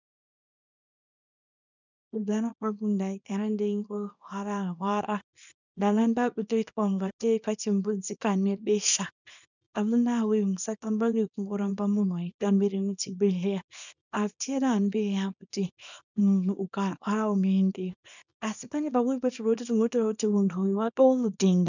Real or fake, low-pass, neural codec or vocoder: fake; 7.2 kHz; codec, 24 kHz, 0.9 kbps, WavTokenizer, small release